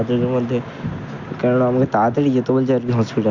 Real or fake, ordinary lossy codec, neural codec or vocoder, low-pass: real; none; none; 7.2 kHz